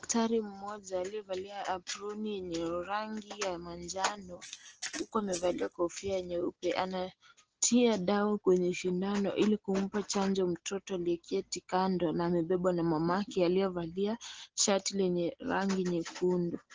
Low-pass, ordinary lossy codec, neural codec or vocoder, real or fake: 7.2 kHz; Opus, 16 kbps; none; real